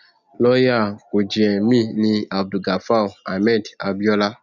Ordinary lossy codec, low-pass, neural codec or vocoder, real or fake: none; 7.2 kHz; none; real